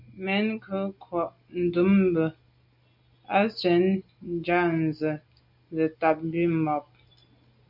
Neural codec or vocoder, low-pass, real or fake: none; 5.4 kHz; real